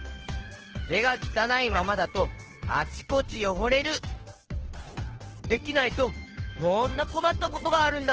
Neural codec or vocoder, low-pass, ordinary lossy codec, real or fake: codec, 16 kHz, 2 kbps, FunCodec, trained on Chinese and English, 25 frames a second; 7.2 kHz; Opus, 16 kbps; fake